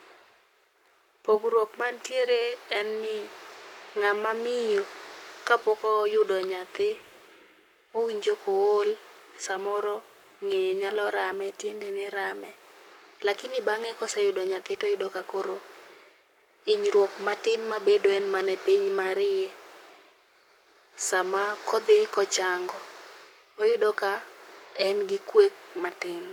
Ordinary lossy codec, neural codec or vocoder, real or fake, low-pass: none; codec, 44.1 kHz, 7.8 kbps, Pupu-Codec; fake; 19.8 kHz